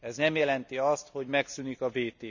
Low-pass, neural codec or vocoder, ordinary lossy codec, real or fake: 7.2 kHz; none; none; real